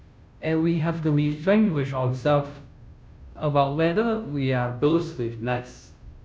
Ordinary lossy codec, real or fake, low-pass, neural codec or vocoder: none; fake; none; codec, 16 kHz, 0.5 kbps, FunCodec, trained on Chinese and English, 25 frames a second